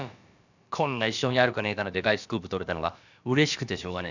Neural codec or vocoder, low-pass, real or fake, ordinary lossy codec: codec, 16 kHz, about 1 kbps, DyCAST, with the encoder's durations; 7.2 kHz; fake; none